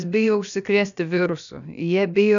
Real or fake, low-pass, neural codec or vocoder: fake; 7.2 kHz; codec, 16 kHz, about 1 kbps, DyCAST, with the encoder's durations